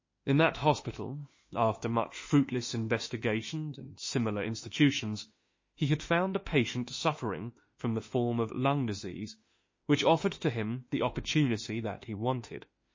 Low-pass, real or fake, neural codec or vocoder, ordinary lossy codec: 7.2 kHz; fake; autoencoder, 48 kHz, 32 numbers a frame, DAC-VAE, trained on Japanese speech; MP3, 32 kbps